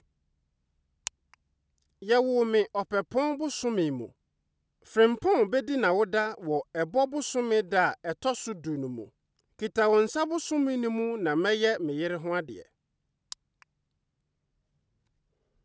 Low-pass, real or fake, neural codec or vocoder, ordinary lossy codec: none; real; none; none